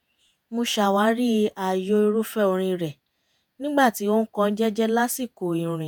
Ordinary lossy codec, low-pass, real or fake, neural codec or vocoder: none; none; fake; vocoder, 48 kHz, 128 mel bands, Vocos